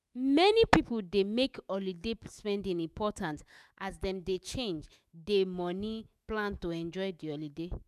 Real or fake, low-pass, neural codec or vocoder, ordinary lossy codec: fake; 14.4 kHz; autoencoder, 48 kHz, 128 numbers a frame, DAC-VAE, trained on Japanese speech; none